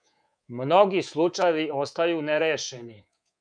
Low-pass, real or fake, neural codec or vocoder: 9.9 kHz; fake; codec, 24 kHz, 3.1 kbps, DualCodec